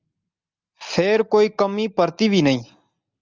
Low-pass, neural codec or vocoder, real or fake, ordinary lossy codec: 7.2 kHz; none; real; Opus, 32 kbps